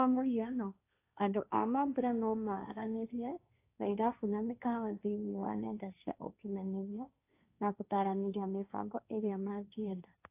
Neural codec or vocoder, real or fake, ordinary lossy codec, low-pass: codec, 16 kHz, 1.1 kbps, Voila-Tokenizer; fake; AAC, 24 kbps; 3.6 kHz